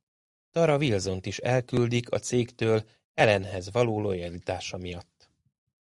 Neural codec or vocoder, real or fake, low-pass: none; real; 10.8 kHz